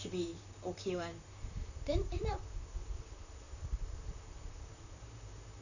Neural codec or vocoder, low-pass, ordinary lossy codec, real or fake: vocoder, 44.1 kHz, 128 mel bands, Pupu-Vocoder; 7.2 kHz; none; fake